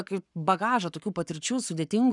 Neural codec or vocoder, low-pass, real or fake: codec, 44.1 kHz, 7.8 kbps, Pupu-Codec; 10.8 kHz; fake